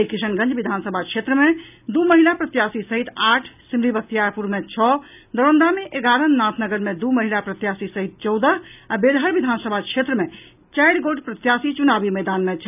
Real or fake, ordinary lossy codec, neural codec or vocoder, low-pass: real; none; none; 3.6 kHz